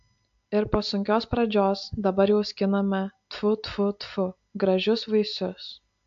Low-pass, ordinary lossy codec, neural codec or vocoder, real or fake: 7.2 kHz; AAC, 64 kbps; none; real